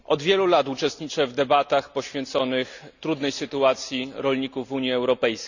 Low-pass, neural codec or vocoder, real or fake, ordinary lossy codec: 7.2 kHz; none; real; none